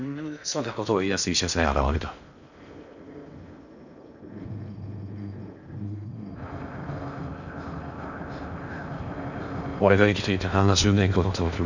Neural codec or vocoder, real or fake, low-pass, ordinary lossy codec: codec, 16 kHz in and 24 kHz out, 0.6 kbps, FocalCodec, streaming, 2048 codes; fake; 7.2 kHz; none